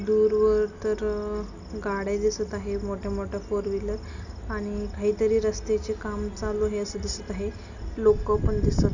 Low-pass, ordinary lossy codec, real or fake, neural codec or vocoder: 7.2 kHz; none; real; none